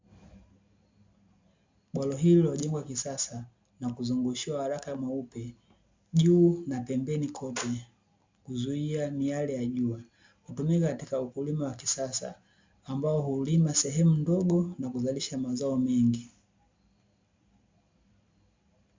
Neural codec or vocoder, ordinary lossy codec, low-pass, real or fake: none; MP3, 64 kbps; 7.2 kHz; real